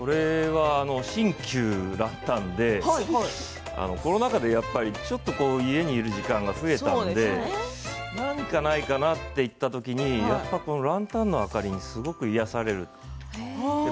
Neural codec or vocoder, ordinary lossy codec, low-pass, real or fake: none; none; none; real